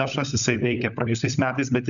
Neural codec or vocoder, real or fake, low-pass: codec, 16 kHz, 16 kbps, FunCodec, trained on LibriTTS, 50 frames a second; fake; 7.2 kHz